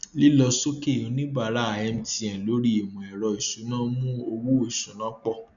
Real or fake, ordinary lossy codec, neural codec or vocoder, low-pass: real; none; none; 7.2 kHz